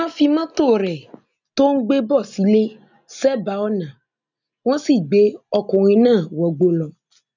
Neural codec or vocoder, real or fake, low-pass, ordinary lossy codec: none; real; 7.2 kHz; none